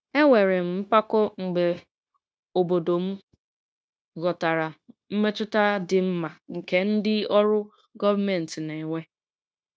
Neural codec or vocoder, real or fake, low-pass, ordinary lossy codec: codec, 16 kHz, 0.9 kbps, LongCat-Audio-Codec; fake; none; none